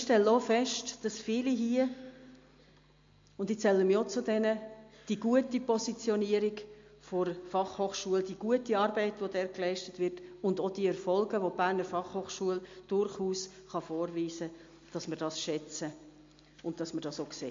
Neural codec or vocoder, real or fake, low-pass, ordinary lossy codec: none; real; 7.2 kHz; MP3, 48 kbps